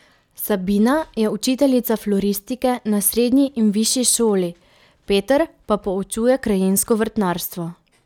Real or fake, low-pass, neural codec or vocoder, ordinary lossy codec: real; 19.8 kHz; none; none